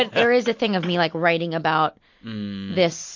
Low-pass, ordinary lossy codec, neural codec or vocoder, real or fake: 7.2 kHz; MP3, 48 kbps; none; real